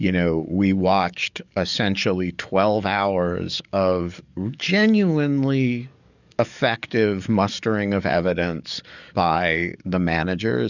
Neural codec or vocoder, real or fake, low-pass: codec, 44.1 kHz, 7.8 kbps, DAC; fake; 7.2 kHz